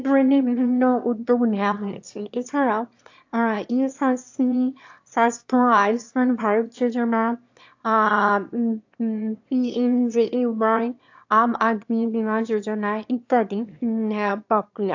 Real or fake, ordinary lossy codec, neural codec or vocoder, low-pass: fake; AAC, 48 kbps; autoencoder, 22.05 kHz, a latent of 192 numbers a frame, VITS, trained on one speaker; 7.2 kHz